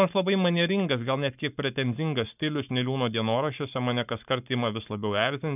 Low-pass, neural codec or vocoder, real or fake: 3.6 kHz; codec, 44.1 kHz, 7.8 kbps, Pupu-Codec; fake